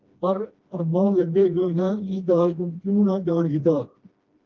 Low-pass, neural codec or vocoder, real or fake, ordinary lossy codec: 7.2 kHz; codec, 16 kHz, 1 kbps, FreqCodec, smaller model; fake; Opus, 32 kbps